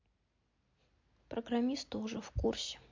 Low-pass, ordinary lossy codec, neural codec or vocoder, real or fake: 7.2 kHz; MP3, 48 kbps; none; real